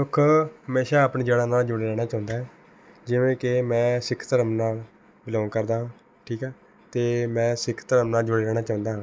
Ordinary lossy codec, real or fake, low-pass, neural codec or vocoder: none; real; none; none